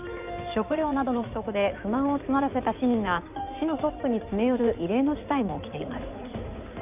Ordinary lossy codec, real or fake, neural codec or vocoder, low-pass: none; fake; codec, 16 kHz in and 24 kHz out, 2.2 kbps, FireRedTTS-2 codec; 3.6 kHz